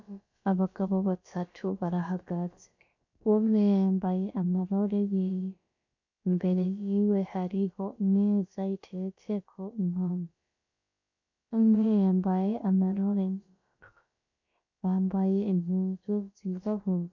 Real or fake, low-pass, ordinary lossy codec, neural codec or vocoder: fake; 7.2 kHz; MP3, 64 kbps; codec, 16 kHz, about 1 kbps, DyCAST, with the encoder's durations